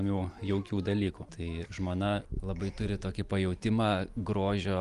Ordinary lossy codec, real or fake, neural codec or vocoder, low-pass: Opus, 32 kbps; real; none; 10.8 kHz